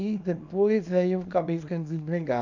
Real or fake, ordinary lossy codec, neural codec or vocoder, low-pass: fake; none; codec, 24 kHz, 0.9 kbps, WavTokenizer, small release; 7.2 kHz